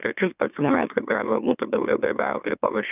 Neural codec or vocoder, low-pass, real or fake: autoencoder, 44.1 kHz, a latent of 192 numbers a frame, MeloTTS; 3.6 kHz; fake